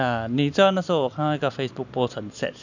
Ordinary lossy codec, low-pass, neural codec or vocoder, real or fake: none; 7.2 kHz; none; real